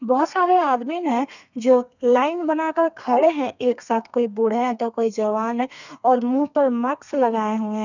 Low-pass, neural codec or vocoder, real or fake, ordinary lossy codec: 7.2 kHz; codec, 32 kHz, 1.9 kbps, SNAC; fake; none